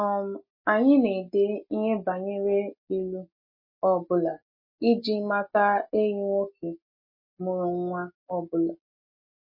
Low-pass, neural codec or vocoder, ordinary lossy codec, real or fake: 5.4 kHz; none; MP3, 24 kbps; real